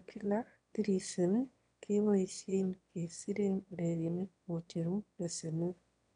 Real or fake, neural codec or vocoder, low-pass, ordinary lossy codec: fake; autoencoder, 22.05 kHz, a latent of 192 numbers a frame, VITS, trained on one speaker; 9.9 kHz; none